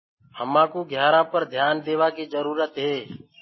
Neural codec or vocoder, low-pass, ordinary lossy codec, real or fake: none; 7.2 kHz; MP3, 24 kbps; real